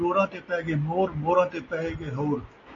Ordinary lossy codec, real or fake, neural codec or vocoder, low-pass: AAC, 32 kbps; real; none; 7.2 kHz